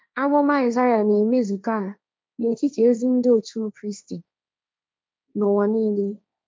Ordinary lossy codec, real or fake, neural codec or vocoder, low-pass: none; fake; codec, 16 kHz, 1.1 kbps, Voila-Tokenizer; none